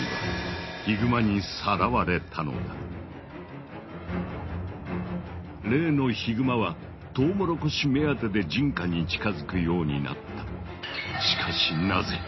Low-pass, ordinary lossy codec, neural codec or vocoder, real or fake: 7.2 kHz; MP3, 24 kbps; none; real